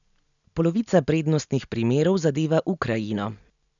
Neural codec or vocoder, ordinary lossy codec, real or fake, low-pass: none; none; real; 7.2 kHz